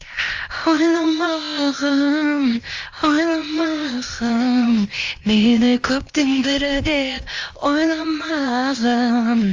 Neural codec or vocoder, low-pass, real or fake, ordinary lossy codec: codec, 16 kHz, 0.8 kbps, ZipCodec; 7.2 kHz; fake; Opus, 32 kbps